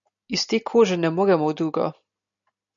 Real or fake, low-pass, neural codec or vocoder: real; 7.2 kHz; none